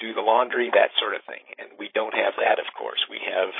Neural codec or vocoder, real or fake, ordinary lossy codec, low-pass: codec, 16 kHz, 8 kbps, FreqCodec, larger model; fake; MP3, 24 kbps; 5.4 kHz